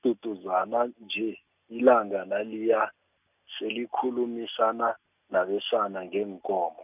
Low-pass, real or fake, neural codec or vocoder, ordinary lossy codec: 3.6 kHz; real; none; none